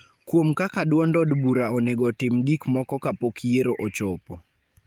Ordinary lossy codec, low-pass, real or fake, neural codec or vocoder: Opus, 32 kbps; 19.8 kHz; fake; codec, 44.1 kHz, 7.8 kbps, DAC